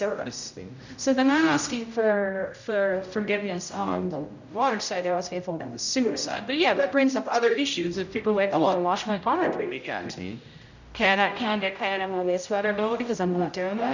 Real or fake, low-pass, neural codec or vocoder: fake; 7.2 kHz; codec, 16 kHz, 0.5 kbps, X-Codec, HuBERT features, trained on general audio